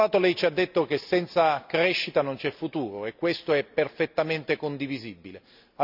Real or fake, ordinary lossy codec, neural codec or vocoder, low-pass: real; none; none; 5.4 kHz